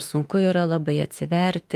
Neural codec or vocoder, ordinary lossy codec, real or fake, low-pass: autoencoder, 48 kHz, 32 numbers a frame, DAC-VAE, trained on Japanese speech; Opus, 32 kbps; fake; 14.4 kHz